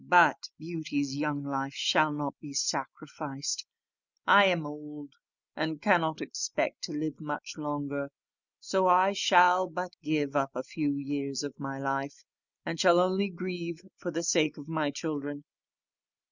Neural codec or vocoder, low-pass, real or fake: none; 7.2 kHz; real